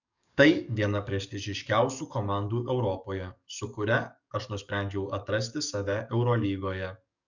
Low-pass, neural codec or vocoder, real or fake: 7.2 kHz; codec, 44.1 kHz, 7.8 kbps, DAC; fake